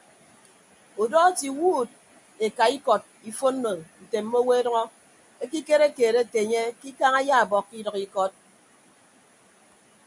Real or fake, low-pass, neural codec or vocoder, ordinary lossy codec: real; 10.8 kHz; none; MP3, 96 kbps